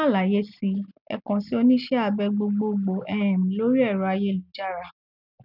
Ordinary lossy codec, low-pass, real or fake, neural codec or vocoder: none; 5.4 kHz; real; none